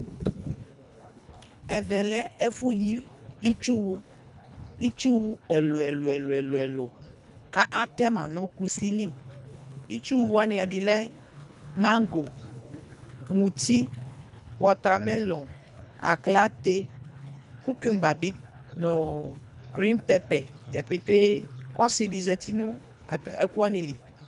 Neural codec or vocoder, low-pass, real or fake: codec, 24 kHz, 1.5 kbps, HILCodec; 10.8 kHz; fake